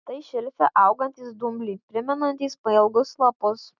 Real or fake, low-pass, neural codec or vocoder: real; 7.2 kHz; none